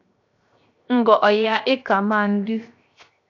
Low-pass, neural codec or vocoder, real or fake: 7.2 kHz; codec, 16 kHz, 0.7 kbps, FocalCodec; fake